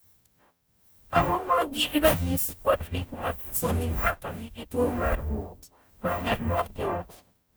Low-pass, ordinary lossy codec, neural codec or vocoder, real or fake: none; none; codec, 44.1 kHz, 0.9 kbps, DAC; fake